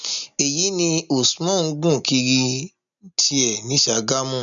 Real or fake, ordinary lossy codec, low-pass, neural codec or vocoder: real; none; 7.2 kHz; none